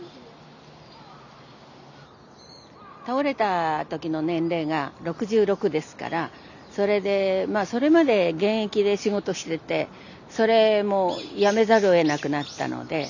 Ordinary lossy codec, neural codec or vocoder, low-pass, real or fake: none; none; 7.2 kHz; real